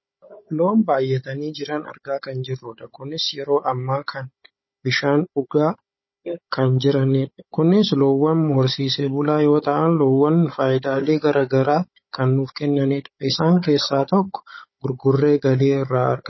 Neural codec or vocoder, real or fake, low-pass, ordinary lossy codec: codec, 16 kHz, 16 kbps, FunCodec, trained on Chinese and English, 50 frames a second; fake; 7.2 kHz; MP3, 24 kbps